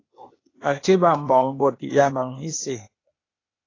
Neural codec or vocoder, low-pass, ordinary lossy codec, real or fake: codec, 16 kHz, 0.8 kbps, ZipCodec; 7.2 kHz; AAC, 32 kbps; fake